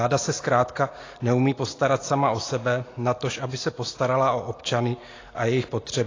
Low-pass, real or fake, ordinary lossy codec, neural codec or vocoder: 7.2 kHz; real; AAC, 32 kbps; none